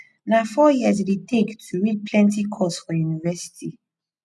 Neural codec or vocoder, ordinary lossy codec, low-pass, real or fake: none; none; none; real